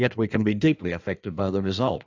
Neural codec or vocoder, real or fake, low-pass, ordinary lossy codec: codec, 16 kHz in and 24 kHz out, 1.1 kbps, FireRedTTS-2 codec; fake; 7.2 kHz; AAC, 48 kbps